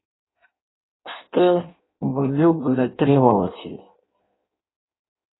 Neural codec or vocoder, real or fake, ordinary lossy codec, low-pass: codec, 16 kHz in and 24 kHz out, 0.6 kbps, FireRedTTS-2 codec; fake; AAC, 16 kbps; 7.2 kHz